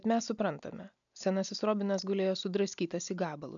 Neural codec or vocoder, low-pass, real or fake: none; 7.2 kHz; real